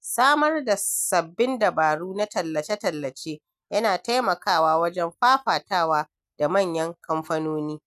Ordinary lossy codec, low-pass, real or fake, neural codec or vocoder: none; 14.4 kHz; real; none